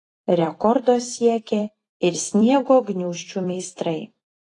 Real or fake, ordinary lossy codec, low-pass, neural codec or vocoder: fake; AAC, 32 kbps; 10.8 kHz; vocoder, 44.1 kHz, 128 mel bands every 256 samples, BigVGAN v2